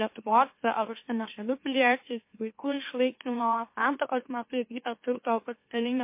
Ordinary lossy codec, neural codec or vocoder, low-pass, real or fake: MP3, 24 kbps; autoencoder, 44.1 kHz, a latent of 192 numbers a frame, MeloTTS; 3.6 kHz; fake